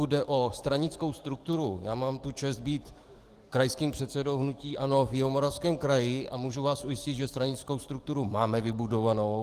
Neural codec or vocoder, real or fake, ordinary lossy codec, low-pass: codec, 44.1 kHz, 7.8 kbps, DAC; fake; Opus, 24 kbps; 14.4 kHz